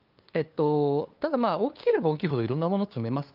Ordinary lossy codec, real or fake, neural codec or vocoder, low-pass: Opus, 32 kbps; fake; codec, 16 kHz, 2 kbps, FunCodec, trained on LibriTTS, 25 frames a second; 5.4 kHz